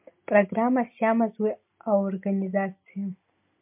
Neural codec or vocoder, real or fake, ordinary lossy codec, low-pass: none; real; MP3, 32 kbps; 3.6 kHz